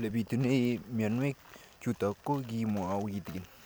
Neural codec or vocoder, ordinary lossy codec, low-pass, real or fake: none; none; none; real